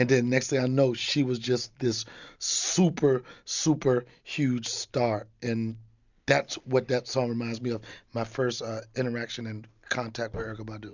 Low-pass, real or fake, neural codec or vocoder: 7.2 kHz; real; none